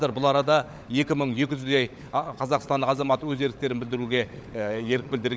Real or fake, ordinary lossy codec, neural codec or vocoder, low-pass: fake; none; codec, 16 kHz, 16 kbps, FunCodec, trained on LibriTTS, 50 frames a second; none